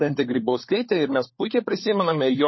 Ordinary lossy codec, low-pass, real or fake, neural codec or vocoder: MP3, 24 kbps; 7.2 kHz; fake; codec, 16 kHz, 4 kbps, FunCodec, trained on LibriTTS, 50 frames a second